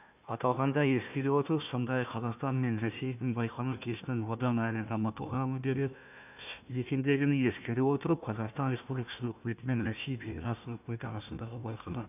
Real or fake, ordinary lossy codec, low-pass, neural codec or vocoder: fake; none; 3.6 kHz; codec, 16 kHz, 1 kbps, FunCodec, trained on Chinese and English, 50 frames a second